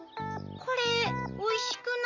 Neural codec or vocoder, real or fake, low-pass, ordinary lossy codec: none; real; 7.2 kHz; none